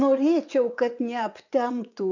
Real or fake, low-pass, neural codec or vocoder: fake; 7.2 kHz; vocoder, 22.05 kHz, 80 mel bands, WaveNeXt